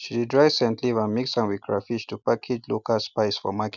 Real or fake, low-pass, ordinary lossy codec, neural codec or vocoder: real; 7.2 kHz; none; none